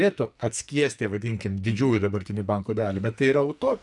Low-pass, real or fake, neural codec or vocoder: 10.8 kHz; fake; codec, 44.1 kHz, 2.6 kbps, SNAC